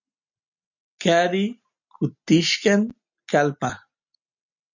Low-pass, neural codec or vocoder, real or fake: 7.2 kHz; none; real